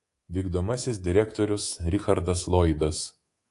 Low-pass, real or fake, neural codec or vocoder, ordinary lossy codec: 10.8 kHz; fake; codec, 24 kHz, 3.1 kbps, DualCodec; AAC, 48 kbps